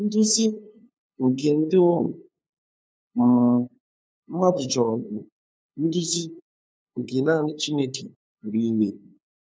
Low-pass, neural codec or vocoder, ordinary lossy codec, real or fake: none; codec, 16 kHz, 2 kbps, FunCodec, trained on LibriTTS, 25 frames a second; none; fake